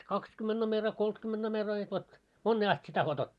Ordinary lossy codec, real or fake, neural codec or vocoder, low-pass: none; real; none; none